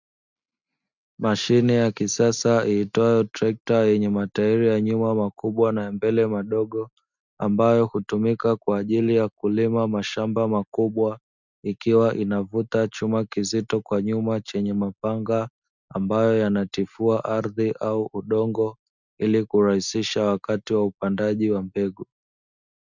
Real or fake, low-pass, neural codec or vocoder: real; 7.2 kHz; none